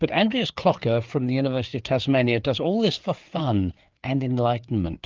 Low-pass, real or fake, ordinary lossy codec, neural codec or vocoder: 7.2 kHz; fake; Opus, 24 kbps; codec, 16 kHz, 4 kbps, FreqCodec, larger model